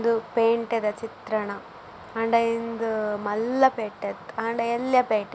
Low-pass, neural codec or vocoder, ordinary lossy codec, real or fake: none; none; none; real